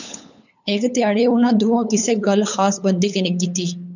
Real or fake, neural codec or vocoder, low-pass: fake; codec, 16 kHz, 8 kbps, FunCodec, trained on LibriTTS, 25 frames a second; 7.2 kHz